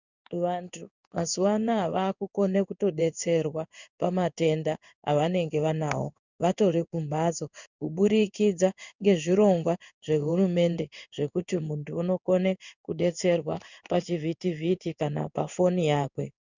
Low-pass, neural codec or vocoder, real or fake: 7.2 kHz; codec, 16 kHz in and 24 kHz out, 1 kbps, XY-Tokenizer; fake